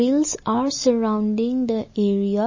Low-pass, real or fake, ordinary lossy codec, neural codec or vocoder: 7.2 kHz; real; MP3, 32 kbps; none